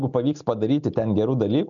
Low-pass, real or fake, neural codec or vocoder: 7.2 kHz; real; none